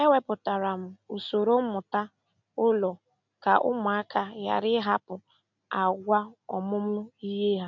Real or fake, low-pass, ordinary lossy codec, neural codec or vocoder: real; 7.2 kHz; none; none